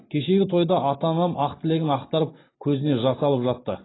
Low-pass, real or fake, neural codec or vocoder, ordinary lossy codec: 7.2 kHz; real; none; AAC, 16 kbps